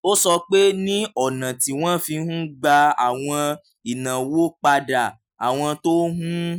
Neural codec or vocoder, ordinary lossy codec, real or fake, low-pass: none; none; real; none